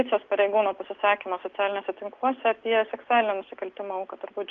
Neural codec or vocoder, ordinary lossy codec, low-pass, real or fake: none; Opus, 32 kbps; 7.2 kHz; real